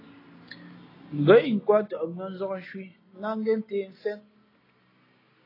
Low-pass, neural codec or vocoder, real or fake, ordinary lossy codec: 5.4 kHz; none; real; AAC, 24 kbps